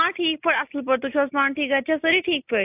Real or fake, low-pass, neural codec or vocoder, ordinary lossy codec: real; 3.6 kHz; none; AAC, 32 kbps